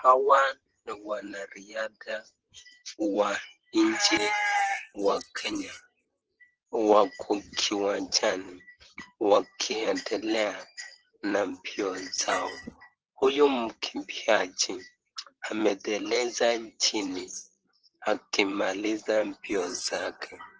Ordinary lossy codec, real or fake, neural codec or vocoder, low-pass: Opus, 16 kbps; fake; vocoder, 44.1 kHz, 128 mel bands, Pupu-Vocoder; 7.2 kHz